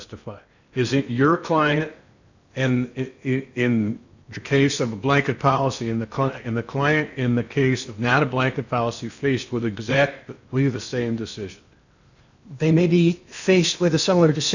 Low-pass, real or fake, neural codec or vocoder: 7.2 kHz; fake; codec, 16 kHz in and 24 kHz out, 0.8 kbps, FocalCodec, streaming, 65536 codes